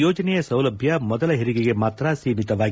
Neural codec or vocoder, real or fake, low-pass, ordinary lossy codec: none; real; none; none